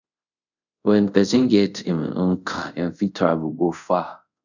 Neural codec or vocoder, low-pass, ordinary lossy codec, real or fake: codec, 24 kHz, 0.5 kbps, DualCodec; 7.2 kHz; none; fake